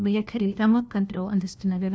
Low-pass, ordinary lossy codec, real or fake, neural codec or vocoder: none; none; fake; codec, 16 kHz, 1 kbps, FunCodec, trained on LibriTTS, 50 frames a second